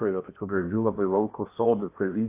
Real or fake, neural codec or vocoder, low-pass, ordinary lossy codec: fake; codec, 16 kHz in and 24 kHz out, 0.6 kbps, FocalCodec, streaming, 2048 codes; 3.6 kHz; AAC, 24 kbps